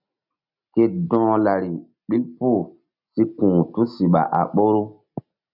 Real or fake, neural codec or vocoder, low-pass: real; none; 5.4 kHz